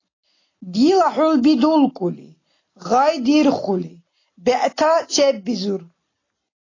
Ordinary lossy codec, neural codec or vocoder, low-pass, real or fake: AAC, 32 kbps; none; 7.2 kHz; real